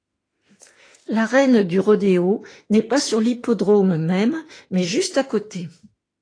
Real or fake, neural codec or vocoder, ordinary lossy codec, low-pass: fake; autoencoder, 48 kHz, 32 numbers a frame, DAC-VAE, trained on Japanese speech; AAC, 32 kbps; 9.9 kHz